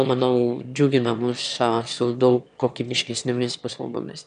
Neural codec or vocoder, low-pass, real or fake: autoencoder, 22.05 kHz, a latent of 192 numbers a frame, VITS, trained on one speaker; 9.9 kHz; fake